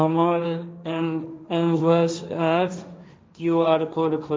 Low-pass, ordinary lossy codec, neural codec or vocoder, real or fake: none; none; codec, 16 kHz, 1.1 kbps, Voila-Tokenizer; fake